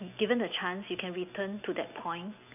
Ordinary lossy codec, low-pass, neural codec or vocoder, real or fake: none; 3.6 kHz; none; real